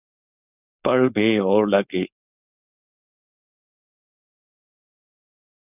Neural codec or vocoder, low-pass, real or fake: codec, 16 kHz, 4.8 kbps, FACodec; 3.6 kHz; fake